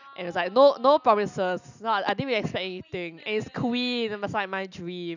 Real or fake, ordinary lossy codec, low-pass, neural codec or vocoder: real; none; 7.2 kHz; none